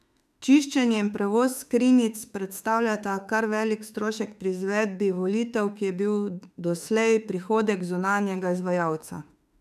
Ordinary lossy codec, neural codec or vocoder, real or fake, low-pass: none; autoencoder, 48 kHz, 32 numbers a frame, DAC-VAE, trained on Japanese speech; fake; 14.4 kHz